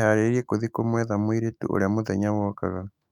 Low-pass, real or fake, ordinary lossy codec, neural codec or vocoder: 19.8 kHz; fake; none; autoencoder, 48 kHz, 128 numbers a frame, DAC-VAE, trained on Japanese speech